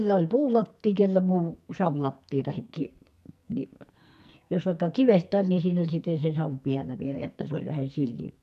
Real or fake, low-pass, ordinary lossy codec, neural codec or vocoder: fake; 14.4 kHz; none; codec, 44.1 kHz, 2.6 kbps, SNAC